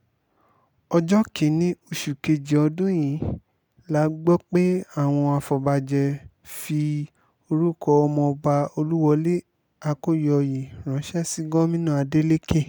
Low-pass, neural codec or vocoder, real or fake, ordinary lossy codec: none; none; real; none